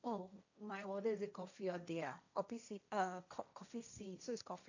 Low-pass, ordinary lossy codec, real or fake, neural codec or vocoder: 7.2 kHz; none; fake; codec, 16 kHz, 1.1 kbps, Voila-Tokenizer